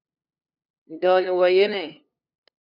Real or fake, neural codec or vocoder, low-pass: fake; codec, 16 kHz, 2 kbps, FunCodec, trained on LibriTTS, 25 frames a second; 5.4 kHz